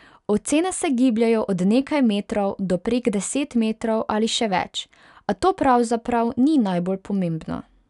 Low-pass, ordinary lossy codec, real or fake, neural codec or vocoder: 10.8 kHz; none; real; none